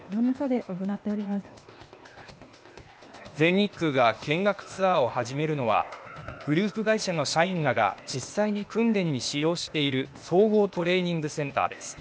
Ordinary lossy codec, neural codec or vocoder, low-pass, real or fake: none; codec, 16 kHz, 0.8 kbps, ZipCodec; none; fake